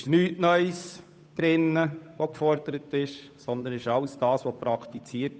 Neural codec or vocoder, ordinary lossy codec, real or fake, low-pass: codec, 16 kHz, 8 kbps, FunCodec, trained on Chinese and English, 25 frames a second; none; fake; none